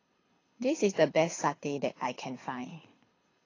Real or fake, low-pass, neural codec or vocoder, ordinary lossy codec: fake; 7.2 kHz; codec, 24 kHz, 6 kbps, HILCodec; AAC, 32 kbps